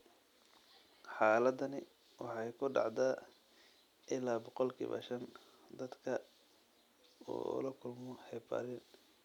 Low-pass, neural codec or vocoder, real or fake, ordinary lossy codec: 19.8 kHz; none; real; none